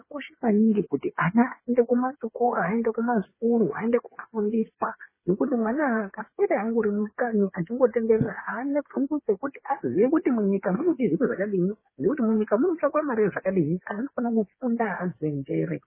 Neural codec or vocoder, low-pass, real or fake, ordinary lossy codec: codec, 16 kHz, 8 kbps, FreqCodec, smaller model; 3.6 kHz; fake; MP3, 16 kbps